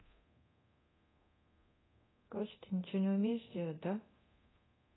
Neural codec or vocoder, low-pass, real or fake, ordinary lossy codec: codec, 24 kHz, 0.9 kbps, DualCodec; 7.2 kHz; fake; AAC, 16 kbps